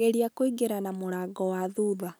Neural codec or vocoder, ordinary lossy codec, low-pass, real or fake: none; none; none; real